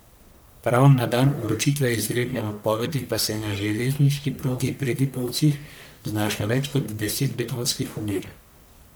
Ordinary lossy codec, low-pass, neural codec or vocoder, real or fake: none; none; codec, 44.1 kHz, 1.7 kbps, Pupu-Codec; fake